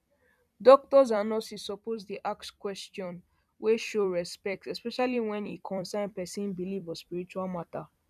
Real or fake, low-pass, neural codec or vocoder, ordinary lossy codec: fake; 14.4 kHz; vocoder, 44.1 kHz, 128 mel bands every 256 samples, BigVGAN v2; none